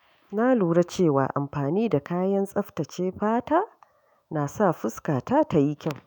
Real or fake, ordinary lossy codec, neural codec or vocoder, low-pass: fake; none; autoencoder, 48 kHz, 128 numbers a frame, DAC-VAE, trained on Japanese speech; 19.8 kHz